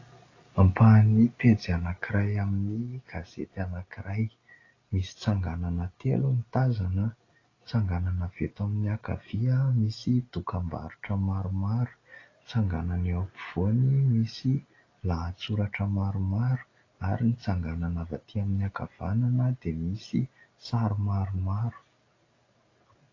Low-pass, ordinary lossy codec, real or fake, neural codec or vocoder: 7.2 kHz; AAC, 32 kbps; real; none